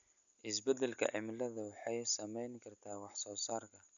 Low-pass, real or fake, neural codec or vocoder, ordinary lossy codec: 7.2 kHz; real; none; none